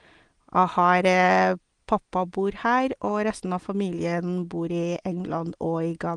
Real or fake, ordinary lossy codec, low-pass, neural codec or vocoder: real; Opus, 24 kbps; 9.9 kHz; none